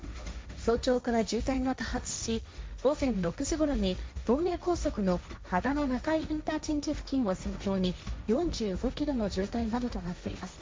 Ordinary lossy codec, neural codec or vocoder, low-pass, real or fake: none; codec, 16 kHz, 1.1 kbps, Voila-Tokenizer; none; fake